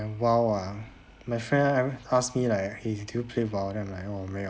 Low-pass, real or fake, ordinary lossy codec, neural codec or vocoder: none; real; none; none